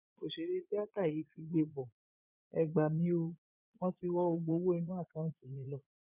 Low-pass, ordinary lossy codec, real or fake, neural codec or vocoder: 3.6 kHz; none; fake; vocoder, 22.05 kHz, 80 mel bands, Vocos